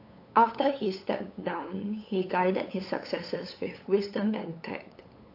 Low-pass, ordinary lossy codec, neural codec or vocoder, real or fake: 5.4 kHz; AAC, 32 kbps; codec, 16 kHz, 8 kbps, FunCodec, trained on LibriTTS, 25 frames a second; fake